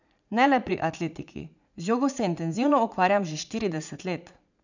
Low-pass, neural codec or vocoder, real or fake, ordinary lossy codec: 7.2 kHz; vocoder, 44.1 kHz, 80 mel bands, Vocos; fake; none